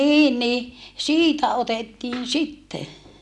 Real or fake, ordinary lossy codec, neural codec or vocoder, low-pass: real; none; none; none